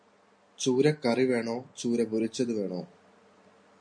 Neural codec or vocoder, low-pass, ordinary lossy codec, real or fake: none; 9.9 kHz; MP3, 48 kbps; real